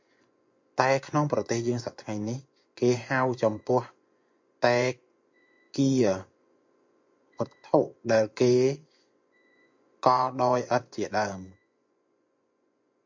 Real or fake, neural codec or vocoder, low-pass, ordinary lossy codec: fake; vocoder, 24 kHz, 100 mel bands, Vocos; 7.2 kHz; MP3, 48 kbps